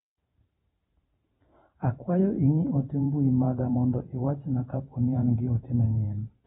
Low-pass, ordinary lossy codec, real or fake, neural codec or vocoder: 19.8 kHz; AAC, 16 kbps; fake; vocoder, 44.1 kHz, 128 mel bands every 512 samples, BigVGAN v2